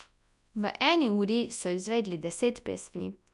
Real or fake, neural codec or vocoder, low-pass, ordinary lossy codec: fake; codec, 24 kHz, 0.9 kbps, WavTokenizer, large speech release; 10.8 kHz; none